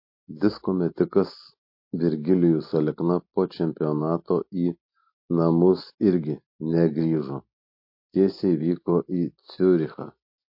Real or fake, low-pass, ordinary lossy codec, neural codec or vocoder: real; 5.4 kHz; MP3, 32 kbps; none